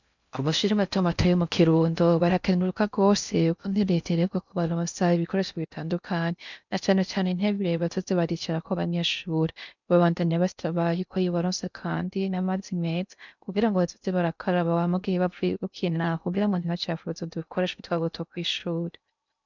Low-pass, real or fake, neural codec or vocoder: 7.2 kHz; fake; codec, 16 kHz in and 24 kHz out, 0.6 kbps, FocalCodec, streaming, 4096 codes